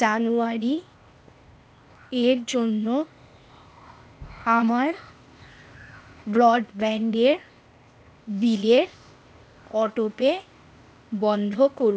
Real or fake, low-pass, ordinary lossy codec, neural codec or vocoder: fake; none; none; codec, 16 kHz, 0.8 kbps, ZipCodec